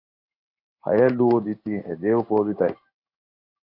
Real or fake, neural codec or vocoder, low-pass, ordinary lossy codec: fake; codec, 16 kHz in and 24 kHz out, 1 kbps, XY-Tokenizer; 5.4 kHz; MP3, 32 kbps